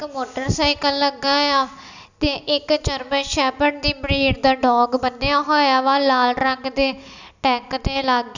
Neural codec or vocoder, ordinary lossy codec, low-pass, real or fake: none; none; 7.2 kHz; real